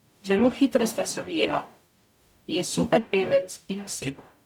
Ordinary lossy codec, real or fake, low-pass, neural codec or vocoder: none; fake; 19.8 kHz; codec, 44.1 kHz, 0.9 kbps, DAC